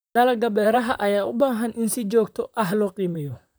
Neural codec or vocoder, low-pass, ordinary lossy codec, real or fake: vocoder, 44.1 kHz, 128 mel bands, Pupu-Vocoder; none; none; fake